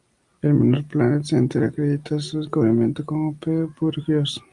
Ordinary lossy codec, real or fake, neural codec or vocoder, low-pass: Opus, 32 kbps; real; none; 10.8 kHz